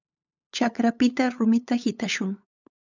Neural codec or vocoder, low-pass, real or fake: codec, 16 kHz, 8 kbps, FunCodec, trained on LibriTTS, 25 frames a second; 7.2 kHz; fake